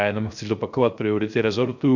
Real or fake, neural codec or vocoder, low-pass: fake; codec, 16 kHz, 0.3 kbps, FocalCodec; 7.2 kHz